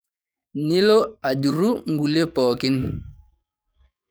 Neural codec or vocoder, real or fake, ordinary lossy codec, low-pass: codec, 44.1 kHz, 7.8 kbps, DAC; fake; none; none